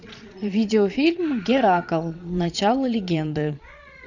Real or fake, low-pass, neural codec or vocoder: fake; 7.2 kHz; vocoder, 22.05 kHz, 80 mel bands, Vocos